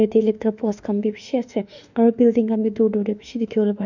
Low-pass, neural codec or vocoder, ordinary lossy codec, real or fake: 7.2 kHz; autoencoder, 48 kHz, 32 numbers a frame, DAC-VAE, trained on Japanese speech; none; fake